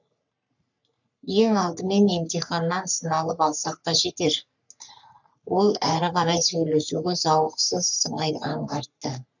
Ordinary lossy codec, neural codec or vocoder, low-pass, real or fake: none; codec, 44.1 kHz, 3.4 kbps, Pupu-Codec; 7.2 kHz; fake